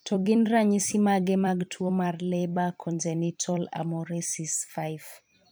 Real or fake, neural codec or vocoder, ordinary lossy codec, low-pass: fake; vocoder, 44.1 kHz, 128 mel bands every 256 samples, BigVGAN v2; none; none